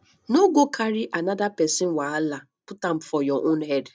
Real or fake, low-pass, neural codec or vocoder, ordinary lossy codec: real; none; none; none